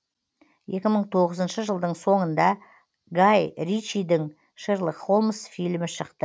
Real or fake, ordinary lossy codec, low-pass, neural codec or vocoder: real; none; none; none